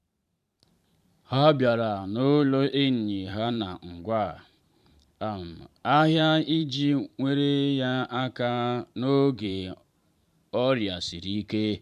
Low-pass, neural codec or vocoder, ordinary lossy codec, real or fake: 14.4 kHz; vocoder, 44.1 kHz, 128 mel bands every 512 samples, BigVGAN v2; none; fake